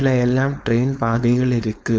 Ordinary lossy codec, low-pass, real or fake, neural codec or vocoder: none; none; fake; codec, 16 kHz, 4.8 kbps, FACodec